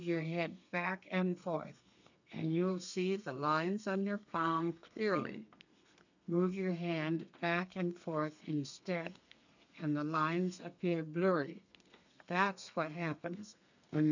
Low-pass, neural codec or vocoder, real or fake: 7.2 kHz; codec, 32 kHz, 1.9 kbps, SNAC; fake